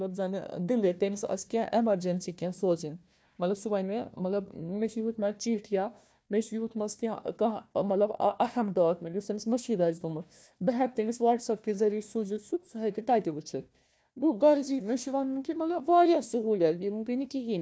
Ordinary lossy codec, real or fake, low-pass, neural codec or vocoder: none; fake; none; codec, 16 kHz, 1 kbps, FunCodec, trained on Chinese and English, 50 frames a second